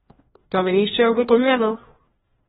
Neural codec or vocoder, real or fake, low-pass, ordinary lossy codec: codec, 16 kHz, 1 kbps, FreqCodec, larger model; fake; 7.2 kHz; AAC, 16 kbps